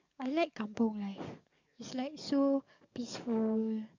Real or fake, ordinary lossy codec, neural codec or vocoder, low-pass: fake; MP3, 64 kbps; codec, 44.1 kHz, 7.8 kbps, DAC; 7.2 kHz